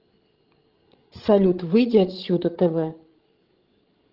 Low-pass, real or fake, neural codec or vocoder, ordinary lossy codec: 5.4 kHz; fake; codec, 16 kHz, 16 kbps, FreqCodec, larger model; Opus, 16 kbps